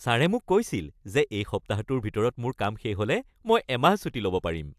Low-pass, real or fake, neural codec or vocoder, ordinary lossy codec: 14.4 kHz; real; none; AAC, 96 kbps